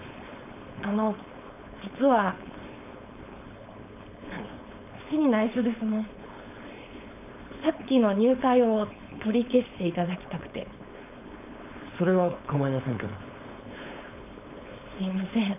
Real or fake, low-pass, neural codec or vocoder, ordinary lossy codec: fake; 3.6 kHz; codec, 16 kHz, 4.8 kbps, FACodec; none